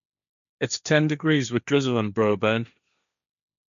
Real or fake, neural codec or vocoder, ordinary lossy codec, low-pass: fake; codec, 16 kHz, 1.1 kbps, Voila-Tokenizer; none; 7.2 kHz